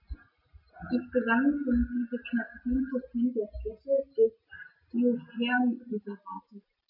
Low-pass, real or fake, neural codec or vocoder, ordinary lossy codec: 5.4 kHz; real; none; none